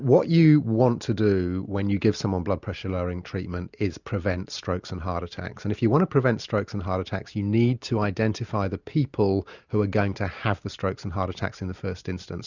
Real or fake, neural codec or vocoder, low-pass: real; none; 7.2 kHz